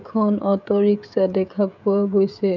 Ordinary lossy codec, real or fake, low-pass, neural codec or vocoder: none; fake; 7.2 kHz; codec, 16 kHz, 16 kbps, FreqCodec, smaller model